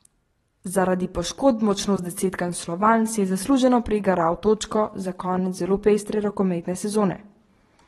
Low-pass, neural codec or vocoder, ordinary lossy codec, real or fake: 19.8 kHz; vocoder, 44.1 kHz, 128 mel bands every 512 samples, BigVGAN v2; AAC, 32 kbps; fake